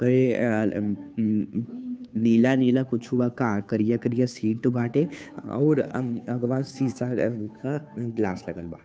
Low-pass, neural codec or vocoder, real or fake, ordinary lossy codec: none; codec, 16 kHz, 2 kbps, FunCodec, trained on Chinese and English, 25 frames a second; fake; none